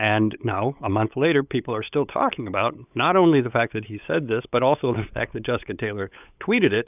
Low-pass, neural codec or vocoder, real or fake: 3.6 kHz; codec, 16 kHz, 8 kbps, FunCodec, trained on LibriTTS, 25 frames a second; fake